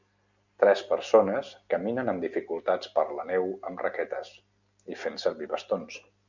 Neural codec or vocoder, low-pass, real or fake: none; 7.2 kHz; real